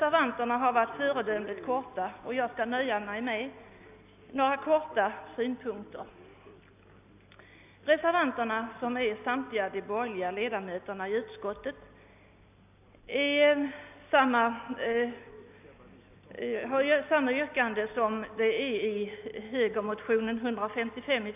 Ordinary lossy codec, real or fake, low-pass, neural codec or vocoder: AAC, 32 kbps; real; 3.6 kHz; none